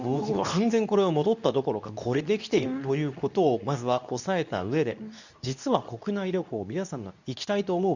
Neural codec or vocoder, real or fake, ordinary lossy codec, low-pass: codec, 24 kHz, 0.9 kbps, WavTokenizer, medium speech release version 2; fake; none; 7.2 kHz